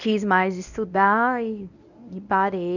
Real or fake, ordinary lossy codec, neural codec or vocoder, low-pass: fake; none; codec, 24 kHz, 0.9 kbps, WavTokenizer, medium speech release version 1; 7.2 kHz